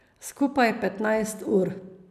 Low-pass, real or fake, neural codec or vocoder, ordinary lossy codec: 14.4 kHz; real; none; none